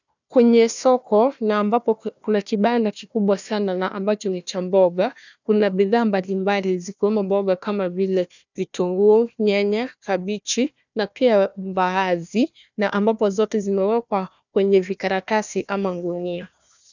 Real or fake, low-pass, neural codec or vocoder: fake; 7.2 kHz; codec, 16 kHz, 1 kbps, FunCodec, trained on Chinese and English, 50 frames a second